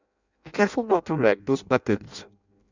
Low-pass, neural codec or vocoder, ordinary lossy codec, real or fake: 7.2 kHz; codec, 16 kHz in and 24 kHz out, 0.6 kbps, FireRedTTS-2 codec; none; fake